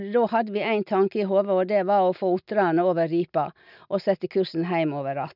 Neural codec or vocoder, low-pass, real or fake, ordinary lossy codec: none; 5.4 kHz; real; none